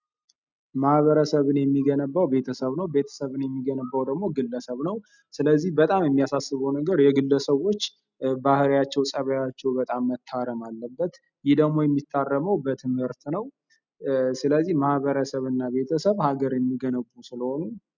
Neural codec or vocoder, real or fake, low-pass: none; real; 7.2 kHz